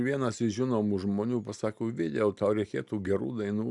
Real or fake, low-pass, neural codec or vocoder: real; 10.8 kHz; none